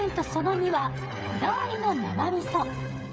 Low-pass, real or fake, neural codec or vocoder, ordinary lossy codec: none; fake; codec, 16 kHz, 8 kbps, FreqCodec, smaller model; none